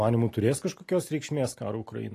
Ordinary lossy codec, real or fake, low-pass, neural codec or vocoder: AAC, 48 kbps; real; 14.4 kHz; none